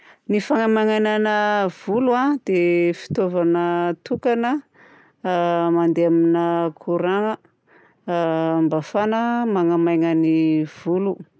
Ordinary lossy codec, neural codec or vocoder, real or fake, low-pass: none; none; real; none